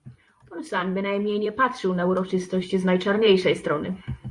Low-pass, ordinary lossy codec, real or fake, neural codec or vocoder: 10.8 kHz; Opus, 64 kbps; fake; vocoder, 44.1 kHz, 128 mel bands every 512 samples, BigVGAN v2